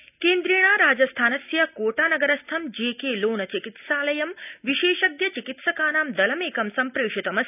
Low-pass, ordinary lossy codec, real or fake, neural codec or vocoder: 3.6 kHz; none; real; none